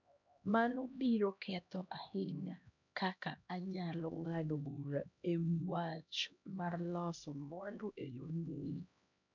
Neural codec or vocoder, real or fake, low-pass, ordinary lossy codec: codec, 16 kHz, 1 kbps, X-Codec, HuBERT features, trained on LibriSpeech; fake; 7.2 kHz; none